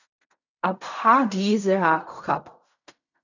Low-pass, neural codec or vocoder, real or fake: 7.2 kHz; codec, 16 kHz in and 24 kHz out, 0.4 kbps, LongCat-Audio-Codec, fine tuned four codebook decoder; fake